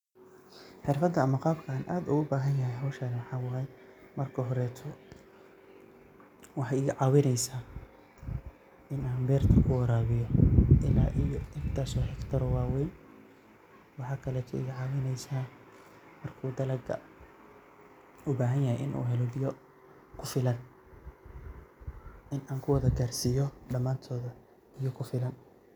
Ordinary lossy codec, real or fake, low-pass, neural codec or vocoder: none; real; 19.8 kHz; none